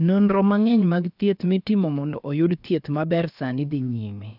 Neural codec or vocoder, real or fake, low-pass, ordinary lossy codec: codec, 16 kHz, about 1 kbps, DyCAST, with the encoder's durations; fake; 5.4 kHz; none